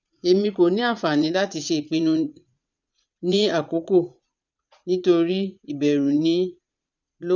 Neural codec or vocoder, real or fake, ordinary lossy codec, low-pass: vocoder, 44.1 kHz, 80 mel bands, Vocos; fake; none; 7.2 kHz